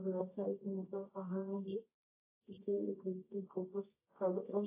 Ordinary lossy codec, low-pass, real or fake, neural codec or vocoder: AAC, 32 kbps; 3.6 kHz; fake; codec, 24 kHz, 0.9 kbps, WavTokenizer, medium music audio release